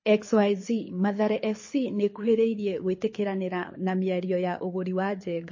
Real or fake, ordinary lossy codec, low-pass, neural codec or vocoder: fake; MP3, 32 kbps; 7.2 kHz; codec, 24 kHz, 6 kbps, HILCodec